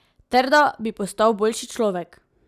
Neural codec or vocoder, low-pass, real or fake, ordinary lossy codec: none; 14.4 kHz; real; none